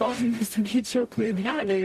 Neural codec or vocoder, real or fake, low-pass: codec, 44.1 kHz, 0.9 kbps, DAC; fake; 14.4 kHz